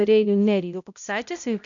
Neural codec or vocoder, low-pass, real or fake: codec, 16 kHz, 0.5 kbps, X-Codec, HuBERT features, trained on balanced general audio; 7.2 kHz; fake